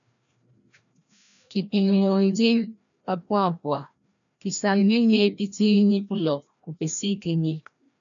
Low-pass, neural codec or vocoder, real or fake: 7.2 kHz; codec, 16 kHz, 1 kbps, FreqCodec, larger model; fake